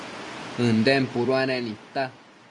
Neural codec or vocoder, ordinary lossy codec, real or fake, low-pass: none; MP3, 48 kbps; real; 10.8 kHz